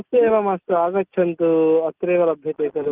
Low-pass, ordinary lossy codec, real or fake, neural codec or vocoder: 3.6 kHz; Opus, 32 kbps; real; none